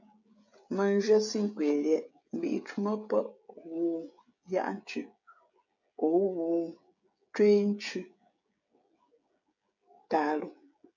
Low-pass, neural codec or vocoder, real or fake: 7.2 kHz; codec, 16 kHz, 16 kbps, FreqCodec, smaller model; fake